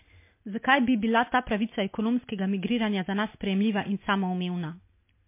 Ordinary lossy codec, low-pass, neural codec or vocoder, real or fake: MP3, 24 kbps; 3.6 kHz; none; real